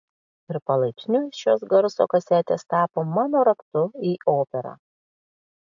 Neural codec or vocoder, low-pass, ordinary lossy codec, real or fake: none; 7.2 kHz; AAC, 64 kbps; real